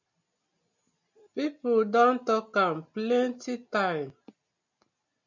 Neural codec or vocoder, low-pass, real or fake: none; 7.2 kHz; real